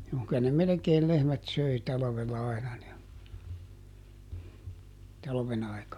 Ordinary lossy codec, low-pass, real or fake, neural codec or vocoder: none; 19.8 kHz; real; none